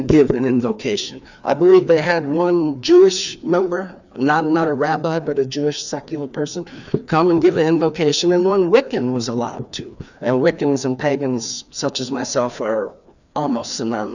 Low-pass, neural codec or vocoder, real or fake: 7.2 kHz; codec, 16 kHz, 2 kbps, FreqCodec, larger model; fake